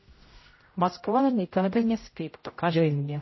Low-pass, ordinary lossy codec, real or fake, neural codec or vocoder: 7.2 kHz; MP3, 24 kbps; fake; codec, 16 kHz, 0.5 kbps, X-Codec, HuBERT features, trained on general audio